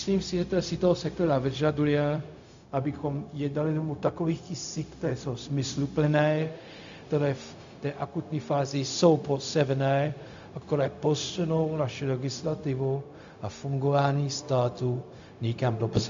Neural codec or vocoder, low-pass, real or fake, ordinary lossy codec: codec, 16 kHz, 0.4 kbps, LongCat-Audio-Codec; 7.2 kHz; fake; AAC, 64 kbps